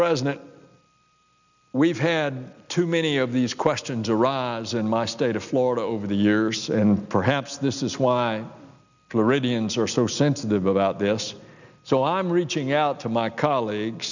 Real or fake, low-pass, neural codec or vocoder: real; 7.2 kHz; none